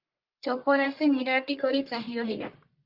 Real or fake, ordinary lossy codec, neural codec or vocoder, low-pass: fake; Opus, 24 kbps; codec, 44.1 kHz, 1.7 kbps, Pupu-Codec; 5.4 kHz